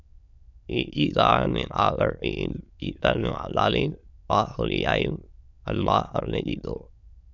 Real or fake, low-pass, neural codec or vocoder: fake; 7.2 kHz; autoencoder, 22.05 kHz, a latent of 192 numbers a frame, VITS, trained on many speakers